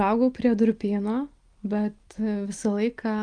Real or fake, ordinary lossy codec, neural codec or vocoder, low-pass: real; Opus, 32 kbps; none; 9.9 kHz